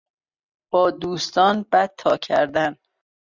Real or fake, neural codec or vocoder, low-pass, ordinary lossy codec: real; none; 7.2 kHz; Opus, 64 kbps